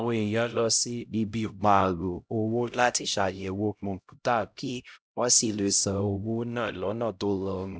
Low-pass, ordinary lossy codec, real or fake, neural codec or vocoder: none; none; fake; codec, 16 kHz, 0.5 kbps, X-Codec, HuBERT features, trained on LibriSpeech